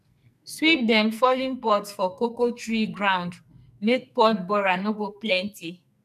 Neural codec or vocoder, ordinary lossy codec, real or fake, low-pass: codec, 44.1 kHz, 2.6 kbps, SNAC; none; fake; 14.4 kHz